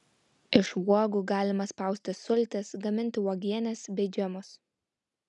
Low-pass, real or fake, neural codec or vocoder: 10.8 kHz; real; none